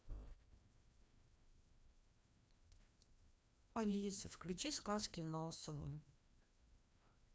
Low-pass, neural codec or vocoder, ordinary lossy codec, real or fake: none; codec, 16 kHz, 1 kbps, FreqCodec, larger model; none; fake